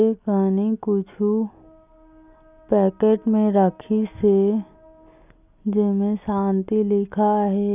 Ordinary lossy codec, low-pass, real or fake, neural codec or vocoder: none; 3.6 kHz; real; none